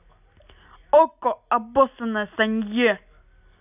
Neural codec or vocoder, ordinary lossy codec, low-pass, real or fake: none; none; 3.6 kHz; real